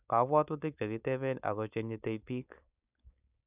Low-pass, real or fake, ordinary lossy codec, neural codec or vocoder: 3.6 kHz; fake; none; codec, 16 kHz, 4.8 kbps, FACodec